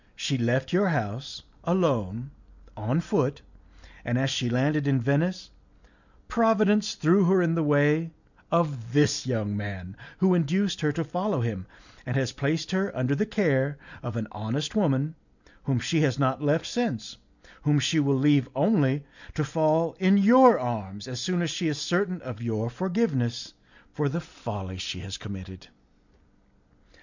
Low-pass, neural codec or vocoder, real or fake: 7.2 kHz; none; real